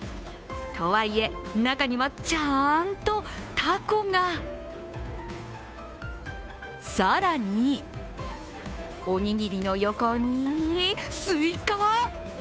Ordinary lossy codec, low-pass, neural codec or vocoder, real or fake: none; none; codec, 16 kHz, 2 kbps, FunCodec, trained on Chinese and English, 25 frames a second; fake